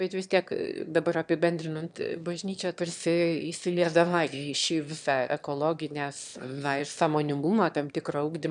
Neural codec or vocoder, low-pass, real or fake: autoencoder, 22.05 kHz, a latent of 192 numbers a frame, VITS, trained on one speaker; 9.9 kHz; fake